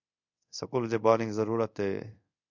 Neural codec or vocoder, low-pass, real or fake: codec, 24 kHz, 0.5 kbps, DualCodec; 7.2 kHz; fake